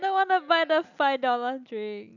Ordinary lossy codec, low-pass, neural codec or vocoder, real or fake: none; 7.2 kHz; none; real